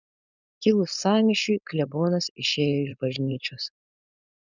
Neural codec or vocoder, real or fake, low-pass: codec, 16 kHz, 4.8 kbps, FACodec; fake; 7.2 kHz